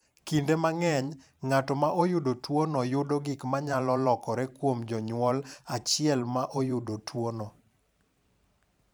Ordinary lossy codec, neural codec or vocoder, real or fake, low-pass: none; vocoder, 44.1 kHz, 128 mel bands every 512 samples, BigVGAN v2; fake; none